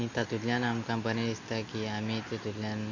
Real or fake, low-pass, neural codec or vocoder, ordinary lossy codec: real; 7.2 kHz; none; AAC, 48 kbps